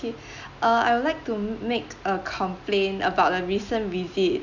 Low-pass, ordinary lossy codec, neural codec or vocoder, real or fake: 7.2 kHz; none; none; real